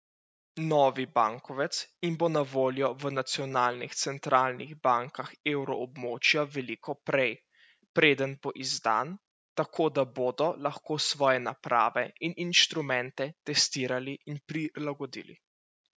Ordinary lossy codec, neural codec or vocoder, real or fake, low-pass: none; none; real; none